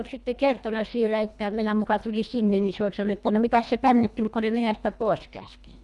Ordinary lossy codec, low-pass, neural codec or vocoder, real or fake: none; none; codec, 24 kHz, 1.5 kbps, HILCodec; fake